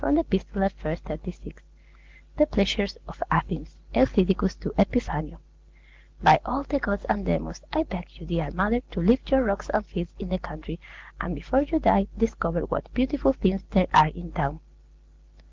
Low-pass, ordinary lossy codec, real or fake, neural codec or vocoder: 7.2 kHz; Opus, 16 kbps; real; none